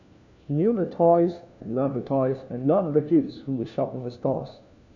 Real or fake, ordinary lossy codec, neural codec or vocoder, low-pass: fake; none; codec, 16 kHz, 1 kbps, FunCodec, trained on LibriTTS, 50 frames a second; 7.2 kHz